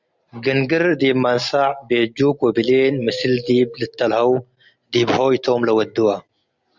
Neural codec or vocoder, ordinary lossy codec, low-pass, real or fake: none; Opus, 64 kbps; 7.2 kHz; real